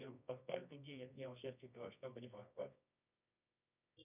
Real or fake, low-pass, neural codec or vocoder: fake; 3.6 kHz; codec, 24 kHz, 0.9 kbps, WavTokenizer, medium music audio release